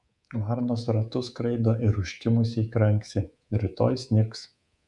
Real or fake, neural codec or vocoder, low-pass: fake; codec, 24 kHz, 3.1 kbps, DualCodec; 10.8 kHz